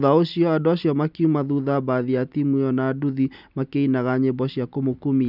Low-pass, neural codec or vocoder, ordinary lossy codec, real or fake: 5.4 kHz; none; none; real